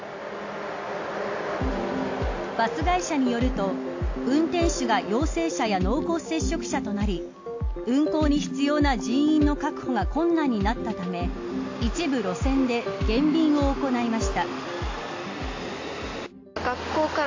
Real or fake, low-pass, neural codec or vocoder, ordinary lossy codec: real; 7.2 kHz; none; AAC, 48 kbps